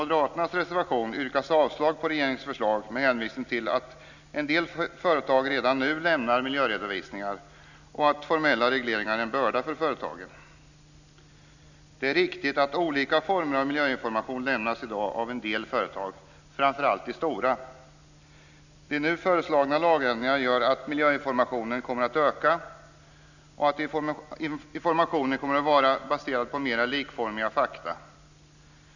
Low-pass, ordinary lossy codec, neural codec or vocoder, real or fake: 7.2 kHz; none; none; real